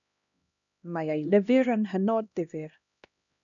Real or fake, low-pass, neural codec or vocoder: fake; 7.2 kHz; codec, 16 kHz, 1 kbps, X-Codec, HuBERT features, trained on LibriSpeech